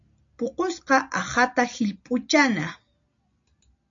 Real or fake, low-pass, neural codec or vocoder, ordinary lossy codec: real; 7.2 kHz; none; AAC, 64 kbps